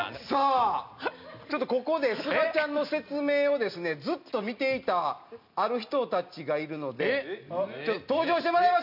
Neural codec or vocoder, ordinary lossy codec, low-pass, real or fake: none; none; 5.4 kHz; real